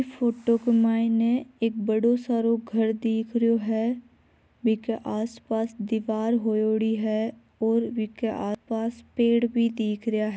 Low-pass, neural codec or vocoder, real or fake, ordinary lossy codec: none; none; real; none